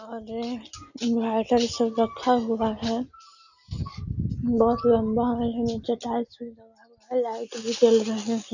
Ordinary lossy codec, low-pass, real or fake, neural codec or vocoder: none; 7.2 kHz; real; none